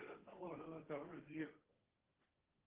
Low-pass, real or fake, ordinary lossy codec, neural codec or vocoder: 3.6 kHz; fake; Opus, 16 kbps; codec, 16 kHz, 1.1 kbps, Voila-Tokenizer